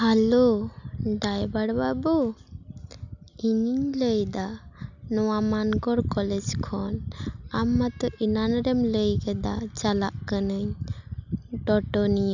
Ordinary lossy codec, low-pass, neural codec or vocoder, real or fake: none; 7.2 kHz; none; real